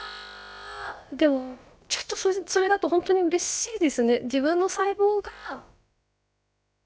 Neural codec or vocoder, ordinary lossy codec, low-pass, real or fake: codec, 16 kHz, about 1 kbps, DyCAST, with the encoder's durations; none; none; fake